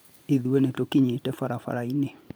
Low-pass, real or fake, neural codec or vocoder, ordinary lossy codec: none; fake; vocoder, 44.1 kHz, 128 mel bands every 512 samples, BigVGAN v2; none